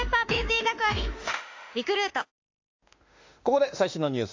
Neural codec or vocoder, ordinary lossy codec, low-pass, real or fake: autoencoder, 48 kHz, 32 numbers a frame, DAC-VAE, trained on Japanese speech; AAC, 48 kbps; 7.2 kHz; fake